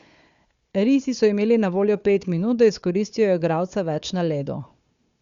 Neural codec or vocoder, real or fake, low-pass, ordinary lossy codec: codec, 16 kHz, 4 kbps, FunCodec, trained on Chinese and English, 50 frames a second; fake; 7.2 kHz; Opus, 64 kbps